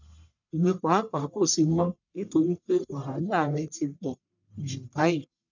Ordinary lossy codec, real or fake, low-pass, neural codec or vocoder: none; fake; 7.2 kHz; codec, 44.1 kHz, 1.7 kbps, Pupu-Codec